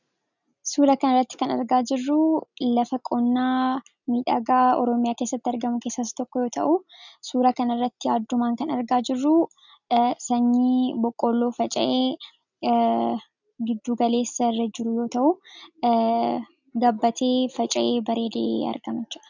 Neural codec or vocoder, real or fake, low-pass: none; real; 7.2 kHz